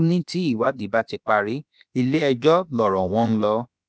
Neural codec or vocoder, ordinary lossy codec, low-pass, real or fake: codec, 16 kHz, about 1 kbps, DyCAST, with the encoder's durations; none; none; fake